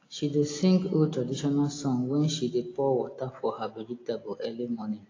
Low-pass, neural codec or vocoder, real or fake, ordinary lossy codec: 7.2 kHz; none; real; AAC, 32 kbps